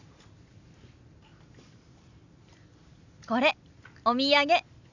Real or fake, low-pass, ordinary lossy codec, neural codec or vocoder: real; 7.2 kHz; none; none